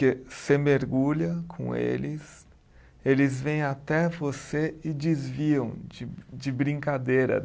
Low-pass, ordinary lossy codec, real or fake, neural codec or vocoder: none; none; real; none